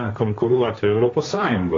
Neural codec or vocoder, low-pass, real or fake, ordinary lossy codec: codec, 16 kHz, 1.1 kbps, Voila-Tokenizer; 7.2 kHz; fake; AAC, 32 kbps